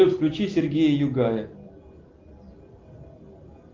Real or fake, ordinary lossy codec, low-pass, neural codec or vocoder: real; Opus, 32 kbps; 7.2 kHz; none